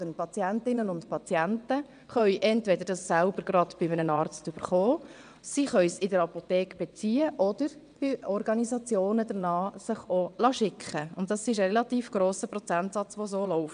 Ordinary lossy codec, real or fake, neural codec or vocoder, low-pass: none; fake; vocoder, 22.05 kHz, 80 mel bands, WaveNeXt; 9.9 kHz